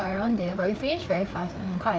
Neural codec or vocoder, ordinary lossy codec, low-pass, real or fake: codec, 16 kHz, 4 kbps, FreqCodec, larger model; none; none; fake